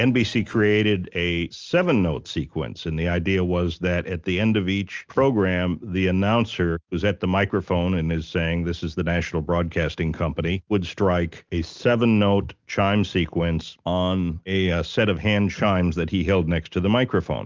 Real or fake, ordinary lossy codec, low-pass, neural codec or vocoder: real; Opus, 24 kbps; 7.2 kHz; none